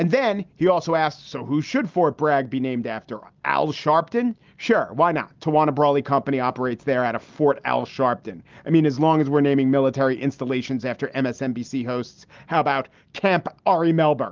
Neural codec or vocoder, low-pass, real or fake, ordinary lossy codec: none; 7.2 kHz; real; Opus, 32 kbps